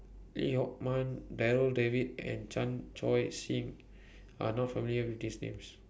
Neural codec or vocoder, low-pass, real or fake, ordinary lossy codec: none; none; real; none